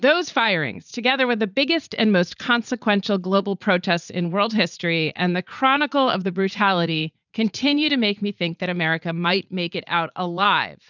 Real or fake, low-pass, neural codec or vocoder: fake; 7.2 kHz; vocoder, 44.1 kHz, 80 mel bands, Vocos